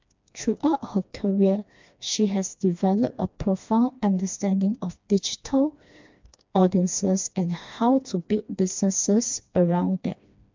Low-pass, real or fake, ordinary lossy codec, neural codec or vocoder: 7.2 kHz; fake; MP3, 64 kbps; codec, 16 kHz, 2 kbps, FreqCodec, smaller model